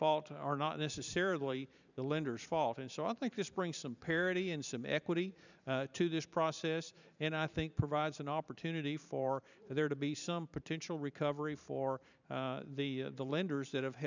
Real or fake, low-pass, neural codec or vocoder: fake; 7.2 kHz; vocoder, 44.1 kHz, 128 mel bands every 512 samples, BigVGAN v2